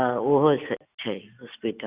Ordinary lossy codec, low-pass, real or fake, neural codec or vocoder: Opus, 64 kbps; 3.6 kHz; real; none